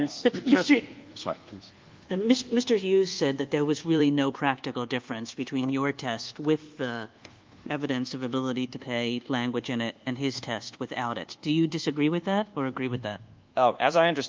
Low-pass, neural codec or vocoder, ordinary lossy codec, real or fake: 7.2 kHz; codec, 24 kHz, 1.2 kbps, DualCodec; Opus, 32 kbps; fake